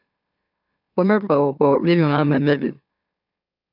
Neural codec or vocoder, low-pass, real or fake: autoencoder, 44.1 kHz, a latent of 192 numbers a frame, MeloTTS; 5.4 kHz; fake